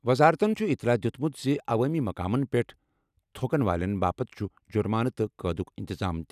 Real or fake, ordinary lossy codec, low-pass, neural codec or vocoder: real; none; 14.4 kHz; none